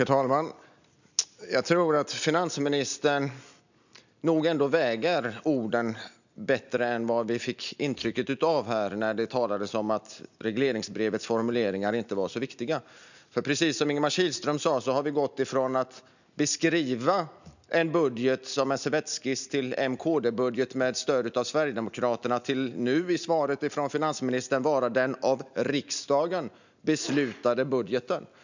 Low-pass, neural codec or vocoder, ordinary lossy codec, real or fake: 7.2 kHz; none; none; real